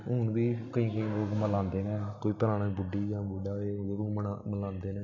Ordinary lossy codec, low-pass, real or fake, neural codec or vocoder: none; 7.2 kHz; real; none